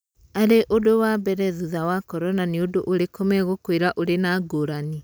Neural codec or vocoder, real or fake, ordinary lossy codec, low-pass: none; real; none; none